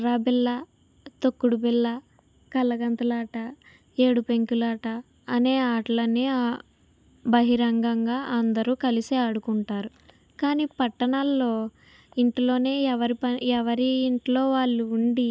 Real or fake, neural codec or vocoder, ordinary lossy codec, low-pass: real; none; none; none